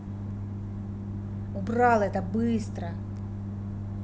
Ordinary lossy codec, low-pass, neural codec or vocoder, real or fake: none; none; none; real